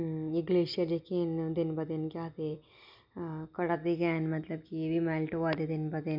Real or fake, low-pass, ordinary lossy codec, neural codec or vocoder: real; 5.4 kHz; none; none